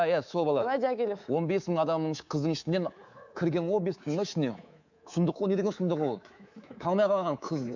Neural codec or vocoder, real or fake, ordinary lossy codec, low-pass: codec, 24 kHz, 3.1 kbps, DualCodec; fake; none; 7.2 kHz